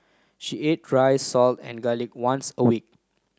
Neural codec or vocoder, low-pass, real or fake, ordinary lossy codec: none; none; real; none